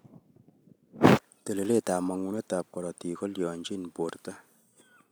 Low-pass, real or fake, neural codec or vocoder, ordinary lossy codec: none; real; none; none